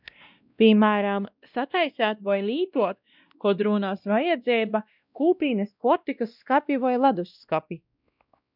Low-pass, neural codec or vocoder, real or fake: 5.4 kHz; codec, 16 kHz, 1 kbps, X-Codec, WavLM features, trained on Multilingual LibriSpeech; fake